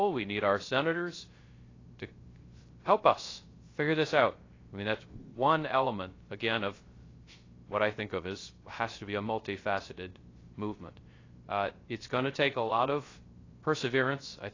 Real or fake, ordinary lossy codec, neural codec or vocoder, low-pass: fake; AAC, 32 kbps; codec, 16 kHz, 0.3 kbps, FocalCodec; 7.2 kHz